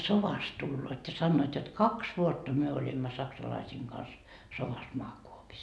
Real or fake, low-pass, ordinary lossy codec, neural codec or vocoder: real; none; none; none